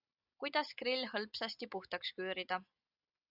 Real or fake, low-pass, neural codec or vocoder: real; 5.4 kHz; none